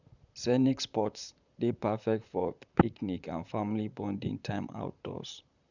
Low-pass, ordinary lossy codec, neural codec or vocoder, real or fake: 7.2 kHz; none; vocoder, 44.1 kHz, 128 mel bands, Pupu-Vocoder; fake